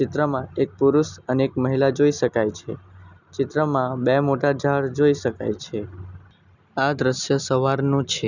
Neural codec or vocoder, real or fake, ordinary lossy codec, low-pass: none; real; none; 7.2 kHz